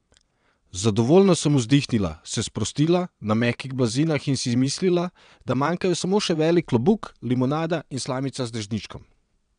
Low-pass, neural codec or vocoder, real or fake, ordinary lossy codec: 9.9 kHz; vocoder, 22.05 kHz, 80 mel bands, WaveNeXt; fake; none